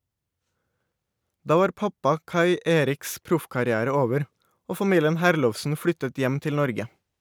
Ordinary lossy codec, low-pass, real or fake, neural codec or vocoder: none; none; real; none